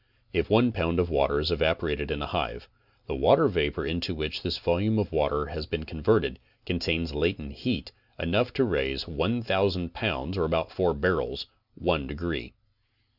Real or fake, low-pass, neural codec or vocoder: real; 5.4 kHz; none